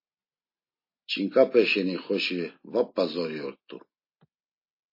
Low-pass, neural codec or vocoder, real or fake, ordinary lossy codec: 5.4 kHz; none; real; MP3, 24 kbps